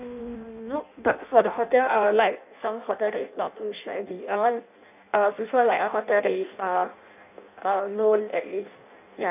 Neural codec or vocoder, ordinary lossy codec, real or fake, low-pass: codec, 16 kHz in and 24 kHz out, 0.6 kbps, FireRedTTS-2 codec; none; fake; 3.6 kHz